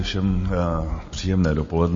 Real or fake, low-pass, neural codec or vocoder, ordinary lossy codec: real; 7.2 kHz; none; MP3, 32 kbps